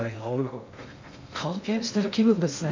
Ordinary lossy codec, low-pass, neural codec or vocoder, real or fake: MP3, 64 kbps; 7.2 kHz; codec, 16 kHz in and 24 kHz out, 0.6 kbps, FocalCodec, streaming, 2048 codes; fake